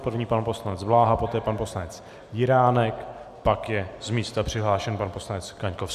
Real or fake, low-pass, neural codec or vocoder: real; 14.4 kHz; none